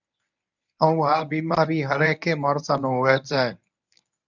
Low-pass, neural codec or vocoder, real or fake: 7.2 kHz; codec, 24 kHz, 0.9 kbps, WavTokenizer, medium speech release version 1; fake